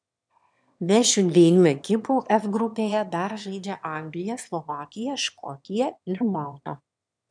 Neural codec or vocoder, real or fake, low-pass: autoencoder, 22.05 kHz, a latent of 192 numbers a frame, VITS, trained on one speaker; fake; 9.9 kHz